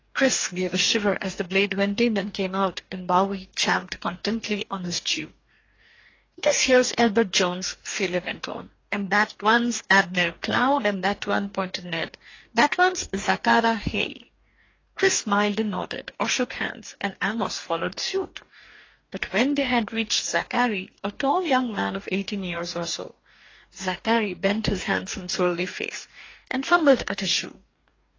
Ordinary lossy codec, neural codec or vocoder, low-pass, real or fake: AAC, 32 kbps; codec, 44.1 kHz, 2.6 kbps, DAC; 7.2 kHz; fake